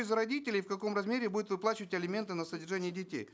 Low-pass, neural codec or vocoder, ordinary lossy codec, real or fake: none; none; none; real